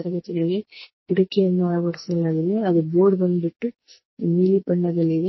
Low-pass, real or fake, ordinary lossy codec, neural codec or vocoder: 7.2 kHz; fake; MP3, 24 kbps; codec, 44.1 kHz, 2.6 kbps, SNAC